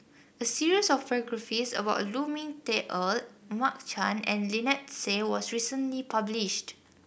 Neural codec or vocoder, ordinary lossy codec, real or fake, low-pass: none; none; real; none